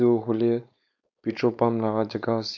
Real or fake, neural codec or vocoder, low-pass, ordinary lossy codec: fake; codec, 16 kHz, 4.8 kbps, FACodec; 7.2 kHz; none